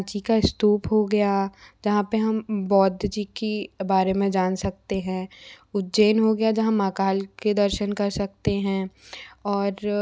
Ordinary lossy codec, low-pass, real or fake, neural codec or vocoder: none; none; real; none